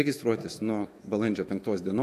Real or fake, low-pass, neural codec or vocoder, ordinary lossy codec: fake; 14.4 kHz; autoencoder, 48 kHz, 128 numbers a frame, DAC-VAE, trained on Japanese speech; AAC, 64 kbps